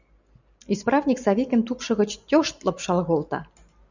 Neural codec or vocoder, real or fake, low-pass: none; real; 7.2 kHz